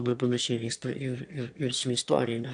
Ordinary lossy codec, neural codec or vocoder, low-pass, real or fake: AAC, 64 kbps; autoencoder, 22.05 kHz, a latent of 192 numbers a frame, VITS, trained on one speaker; 9.9 kHz; fake